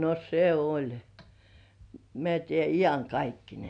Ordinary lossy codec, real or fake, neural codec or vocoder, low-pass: none; real; none; 9.9 kHz